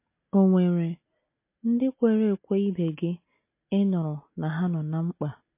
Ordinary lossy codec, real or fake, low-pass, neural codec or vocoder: MP3, 24 kbps; real; 3.6 kHz; none